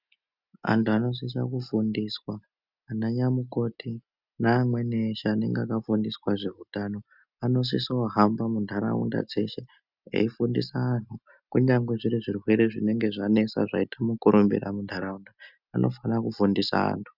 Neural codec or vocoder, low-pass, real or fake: none; 5.4 kHz; real